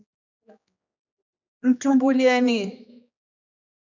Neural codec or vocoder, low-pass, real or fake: codec, 16 kHz, 2 kbps, X-Codec, HuBERT features, trained on general audio; 7.2 kHz; fake